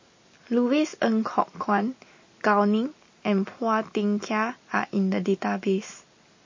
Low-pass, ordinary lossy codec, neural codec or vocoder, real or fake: 7.2 kHz; MP3, 32 kbps; none; real